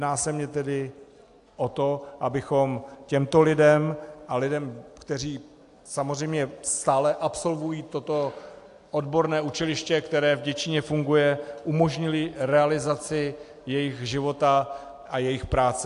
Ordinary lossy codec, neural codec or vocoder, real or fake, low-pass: AAC, 64 kbps; none; real; 10.8 kHz